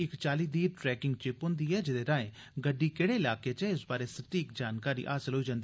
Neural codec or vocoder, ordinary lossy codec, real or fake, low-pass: none; none; real; none